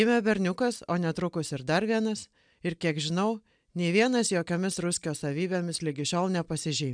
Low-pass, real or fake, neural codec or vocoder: 9.9 kHz; real; none